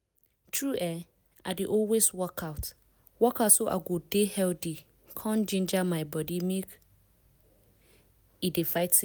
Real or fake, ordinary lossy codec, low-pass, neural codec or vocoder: real; none; none; none